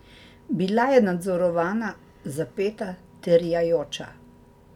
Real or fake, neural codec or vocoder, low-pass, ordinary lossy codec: real; none; 19.8 kHz; none